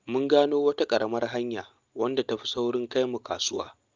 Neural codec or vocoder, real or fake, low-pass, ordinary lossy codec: none; real; 7.2 kHz; Opus, 24 kbps